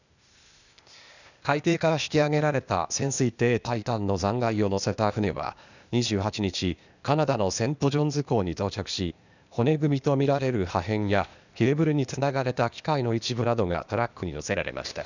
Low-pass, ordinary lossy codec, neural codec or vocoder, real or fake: 7.2 kHz; none; codec, 16 kHz, 0.8 kbps, ZipCodec; fake